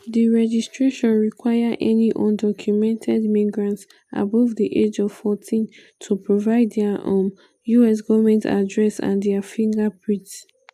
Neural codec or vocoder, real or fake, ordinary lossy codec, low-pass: none; real; AAC, 96 kbps; 14.4 kHz